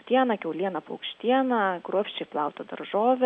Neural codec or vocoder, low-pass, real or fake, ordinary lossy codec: none; 9.9 kHz; real; MP3, 96 kbps